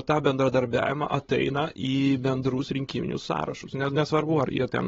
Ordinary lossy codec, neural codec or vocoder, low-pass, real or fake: AAC, 24 kbps; codec, 16 kHz, 16 kbps, FreqCodec, larger model; 7.2 kHz; fake